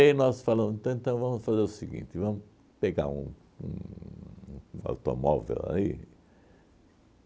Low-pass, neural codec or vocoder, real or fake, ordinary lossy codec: none; none; real; none